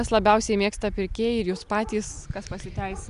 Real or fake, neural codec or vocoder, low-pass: real; none; 10.8 kHz